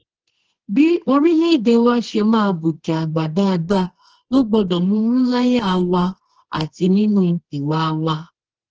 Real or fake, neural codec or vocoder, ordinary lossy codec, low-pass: fake; codec, 24 kHz, 0.9 kbps, WavTokenizer, medium music audio release; Opus, 16 kbps; 7.2 kHz